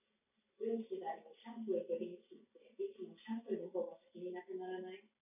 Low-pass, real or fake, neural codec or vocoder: 3.6 kHz; real; none